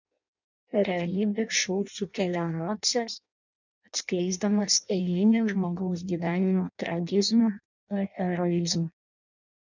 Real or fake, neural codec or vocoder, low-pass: fake; codec, 16 kHz in and 24 kHz out, 0.6 kbps, FireRedTTS-2 codec; 7.2 kHz